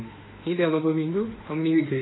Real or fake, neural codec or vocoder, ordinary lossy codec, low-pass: fake; autoencoder, 48 kHz, 32 numbers a frame, DAC-VAE, trained on Japanese speech; AAC, 16 kbps; 7.2 kHz